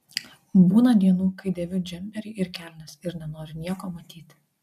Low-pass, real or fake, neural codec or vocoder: 14.4 kHz; real; none